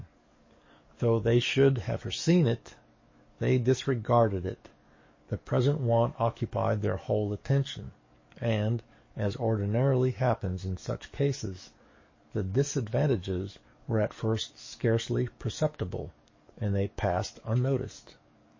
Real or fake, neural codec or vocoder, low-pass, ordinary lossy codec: fake; codec, 44.1 kHz, 7.8 kbps, DAC; 7.2 kHz; MP3, 32 kbps